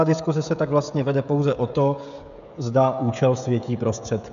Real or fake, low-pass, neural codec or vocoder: fake; 7.2 kHz; codec, 16 kHz, 16 kbps, FreqCodec, smaller model